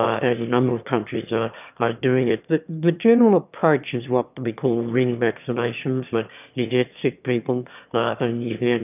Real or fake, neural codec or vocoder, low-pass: fake; autoencoder, 22.05 kHz, a latent of 192 numbers a frame, VITS, trained on one speaker; 3.6 kHz